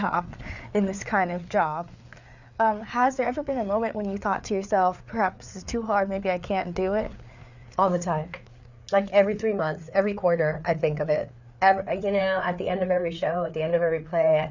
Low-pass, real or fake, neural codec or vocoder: 7.2 kHz; fake; codec, 16 kHz, 4 kbps, FreqCodec, larger model